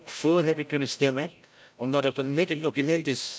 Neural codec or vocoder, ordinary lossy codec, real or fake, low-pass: codec, 16 kHz, 0.5 kbps, FreqCodec, larger model; none; fake; none